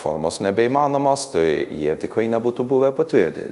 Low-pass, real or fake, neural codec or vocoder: 10.8 kHz; fake; codec, 24 kHz, 0.5 kbps, DualCodec